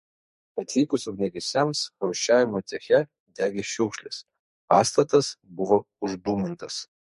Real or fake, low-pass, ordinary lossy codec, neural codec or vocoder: fake; 14.4 kHz; MP3, 48 kbps; codec, 44.1 kHz, 2.6 kbps, SNAC